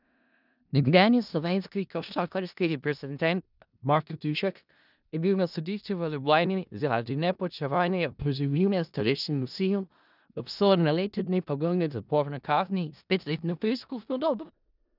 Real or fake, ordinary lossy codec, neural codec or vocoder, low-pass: fake; none; codec, 16 kHz in and 24 kHz out, 0.4 kbps, LongCat-Audio-Codec, four codebook decoder; 5.4 kHz